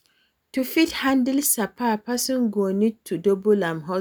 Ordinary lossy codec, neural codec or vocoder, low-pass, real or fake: none; none; none; real